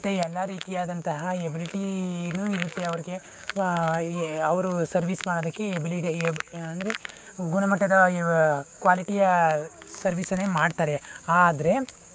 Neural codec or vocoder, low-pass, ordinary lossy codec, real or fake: codec, 16 kHz, 6 kbps, DAC; none; none; fake